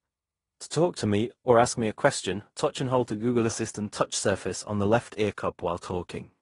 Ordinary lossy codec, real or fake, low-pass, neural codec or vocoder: AAC, 32 kbps; fake; 10.8 kHz; codec, 16 kHz in and 24 kHz out, 0.9 kbps, LongCat-Audio-Codec, fine tuned four codebook decoder